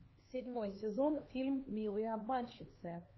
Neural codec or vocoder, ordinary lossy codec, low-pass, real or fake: codec, 16 kHz, 2 kbps, X-Codec, HuBERT features, trained on LibriSpeech; MP3, 24 kbps; 7.2 kHz; fake